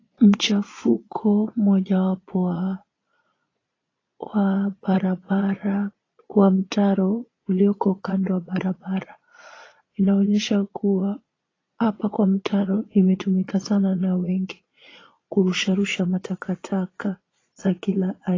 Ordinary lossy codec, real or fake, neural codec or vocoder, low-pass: AAC, 32 kbps; fake; vocoder, 22.05 kHz, 80 mel bands, Vocos; 7.2 kHz